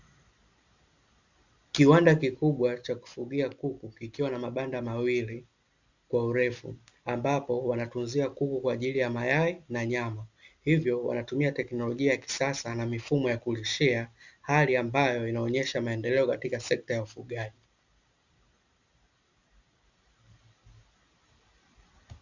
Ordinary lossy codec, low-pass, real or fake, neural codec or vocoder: Opus, 64 kbps; 7.2 kHz; real; none